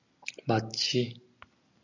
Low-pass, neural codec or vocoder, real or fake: 7.2 kHz; none; real